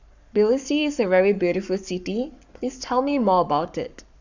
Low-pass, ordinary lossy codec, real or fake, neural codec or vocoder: 7.2 kHz; none; fake; codec, 44.1 kHz, 7.8 kbps, Pupu-Codec